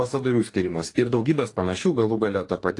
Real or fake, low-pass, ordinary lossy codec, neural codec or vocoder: fake; 10.8 kHz; AAC, 48 kbps; codec, 44.1 kHz, 2.6 kbps, DAC